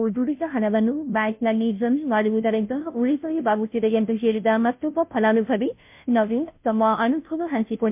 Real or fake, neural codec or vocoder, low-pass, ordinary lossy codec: fake; codec, 16 kHz, 0.5 kbps, FunCodec, trained on Chinese and English, 25 frames a second; 3.6 kHz; MP3, 32 kbps